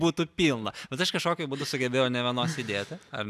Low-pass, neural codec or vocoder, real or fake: 14.4 kHz; none; real